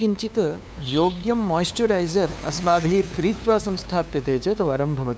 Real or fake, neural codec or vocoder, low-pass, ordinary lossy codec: fake; codec, 16 kHz, 2 kbps, FunCodec, trained on LibriTTS, 25 frames a second; none; none